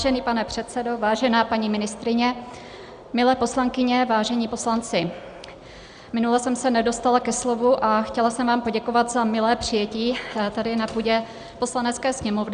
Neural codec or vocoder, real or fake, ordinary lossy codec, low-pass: none; real; Opus, 64 kbps; 9.9 kHz